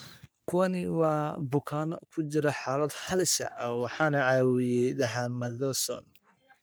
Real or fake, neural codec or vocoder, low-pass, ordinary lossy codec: fake; codec, 44.1 kHz, 3.4 kbps, Pupu-Codec; none; none